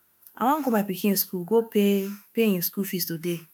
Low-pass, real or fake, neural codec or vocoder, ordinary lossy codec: none; fake; autoencoder, 48 kHz, 32 numbers a frame, DAC-VAE, trained on Japanese speech; none